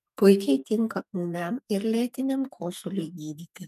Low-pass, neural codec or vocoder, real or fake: 14.4 kHz; codec, 44.1 kHz, 2.6 kbps, SNAC; fake